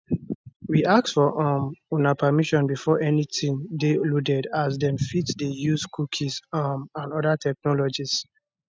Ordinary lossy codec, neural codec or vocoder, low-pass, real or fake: none; none; none; real